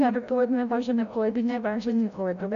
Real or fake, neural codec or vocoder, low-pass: fake; codec, 16 kHz, 0.5 kbps, FreqCodec, larger model; 7.2 kHz